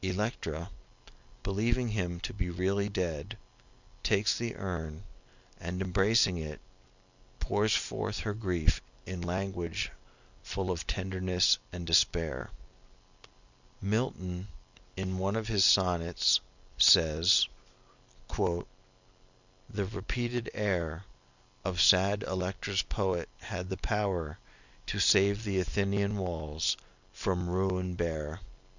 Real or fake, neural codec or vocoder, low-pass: real; none; 7.2 kHz